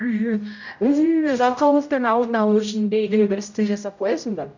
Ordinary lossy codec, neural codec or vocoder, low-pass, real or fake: none; codec, 16 kHz, 0.5 kbps, X-Codec, HuBERT features, trained on general audio; 7.2 kHz; fake